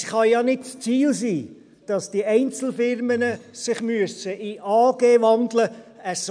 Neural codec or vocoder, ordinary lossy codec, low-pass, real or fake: none; none; 9.9 kHz; real